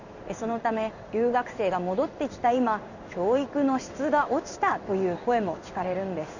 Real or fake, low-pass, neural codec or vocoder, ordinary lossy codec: fake; 7.2 kHz; codec, 16 kHz in and 24 kHz out, 1 kbps, XY-Tokenizer; none